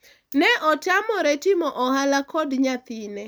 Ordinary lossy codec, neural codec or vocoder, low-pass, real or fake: none; none; none; real